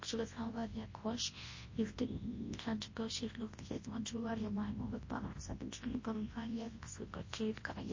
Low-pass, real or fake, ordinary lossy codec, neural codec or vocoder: 7.2 kHz; fake; MP3, 32 kbps; codec, 24 kHz, 0.9 kbps, WavTokenizer, large speech release